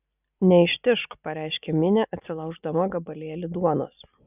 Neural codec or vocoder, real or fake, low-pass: none; real; 3.6 kHz